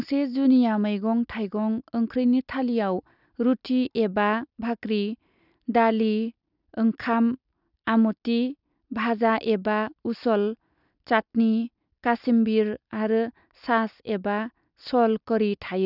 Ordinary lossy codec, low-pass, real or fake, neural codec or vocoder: none; 5.4 kHz; real; none